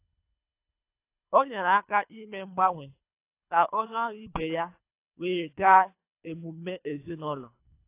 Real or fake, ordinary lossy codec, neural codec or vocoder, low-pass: fake; AAC, 24 kbps; codec, 24 kHz, 3 kbps, HILCodec; 3.6 kHz